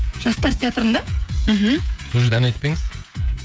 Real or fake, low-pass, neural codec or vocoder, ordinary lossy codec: fake; none; codec, 16 kHz, 16 kbps, FreqCodec, smaller model; none